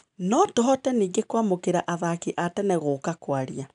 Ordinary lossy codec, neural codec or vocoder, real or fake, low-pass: none; none; real; 9.9 kHz